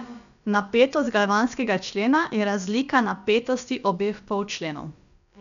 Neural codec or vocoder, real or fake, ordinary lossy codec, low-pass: codec, 16 kHz, about 1 kbps, DyCAST, with the encoder's durations; fake; none; 7.2 kHz